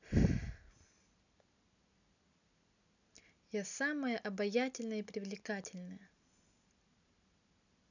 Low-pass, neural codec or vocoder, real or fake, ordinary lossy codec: 7.2 kHz; none; real; none